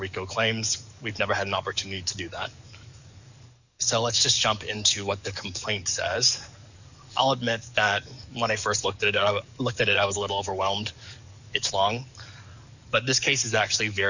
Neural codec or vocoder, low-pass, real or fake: codec, 44.1 kHz, 7.8 kbps, DAC; 7.2 kHz; fake